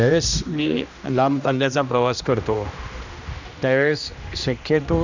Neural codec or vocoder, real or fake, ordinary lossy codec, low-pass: codec, 16 kHz, 1 kbps, X-Codec, HuBERT features, trained on general audio; fake; none; 7.2 kHz